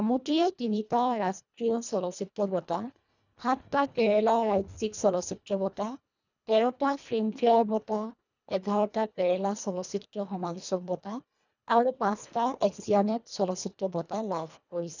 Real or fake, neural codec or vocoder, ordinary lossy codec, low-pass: fake; codec, 24 kHz, 1.5 kbps, HILCodec; none; 7.2 kHz